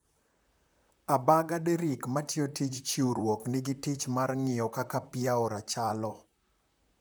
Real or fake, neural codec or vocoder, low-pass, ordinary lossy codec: fake; vocoder, 44.1 kHz, 128 mel bands, Pupu-Vocoder; none; none